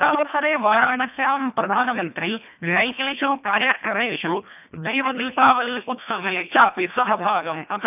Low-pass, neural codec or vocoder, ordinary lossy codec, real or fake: 3.6 kHz; codec, 24 kHz, 1.5 kbps, HILCodec; none; fake